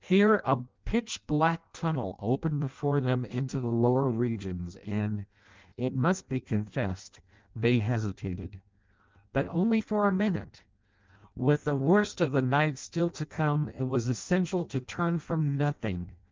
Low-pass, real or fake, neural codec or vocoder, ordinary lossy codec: 7.2 kHz; fake; codec, 16 kHz in and 24 kHz out, 0.6 kbps, FireRedTTS-2 codec; Opus, 24 kbps